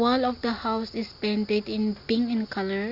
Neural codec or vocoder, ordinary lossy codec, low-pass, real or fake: none; Opus, 64 kbps; 5.4 kHz; real